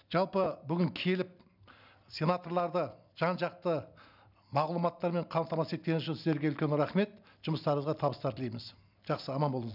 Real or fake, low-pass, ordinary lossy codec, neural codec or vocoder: real; 5.4 kHz; none; none